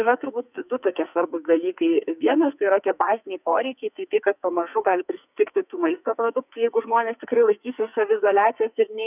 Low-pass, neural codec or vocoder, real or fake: 3.6 kHz; codec, 44.1 kHz, 2.6 kbps, SNAC; fake